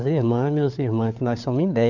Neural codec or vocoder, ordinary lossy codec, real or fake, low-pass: codec, 16 kHz, 4 kbps, FunCodec, trained on LibriTTS, 50 frames a second; none; fake; 7.2 kHz